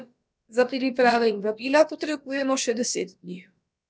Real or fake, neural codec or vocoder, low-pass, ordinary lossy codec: fake; codec, 16 kHz, about 1 kbps, DyCAST, with the encoder's durations; none; none